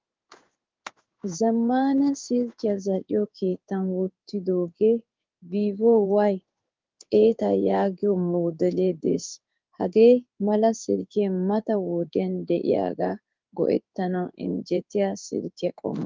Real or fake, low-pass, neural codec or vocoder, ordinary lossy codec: fake; 7.2 kHz; codec, 16 kHz in and 24 kHz out, 1 kbps, XY-Tokenizer; Opus, 32 kbps